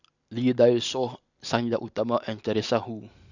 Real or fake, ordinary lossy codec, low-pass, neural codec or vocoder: real; none; 7.2 kHz; none